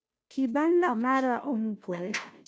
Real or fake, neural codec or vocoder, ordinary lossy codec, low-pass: fake; codec, 16 kHz, 0.5 kbps, FunCodec, trained on Chinese and English, 25 frames a second; none; none